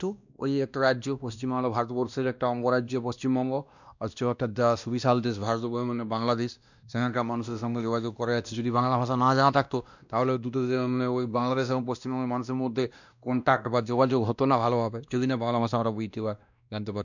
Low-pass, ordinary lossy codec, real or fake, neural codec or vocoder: 7.2 kHz; none; fake; codec, 16 kHz, 1 kbps, X-Codec, WavLM features, trained on Multilingual LibriSpeech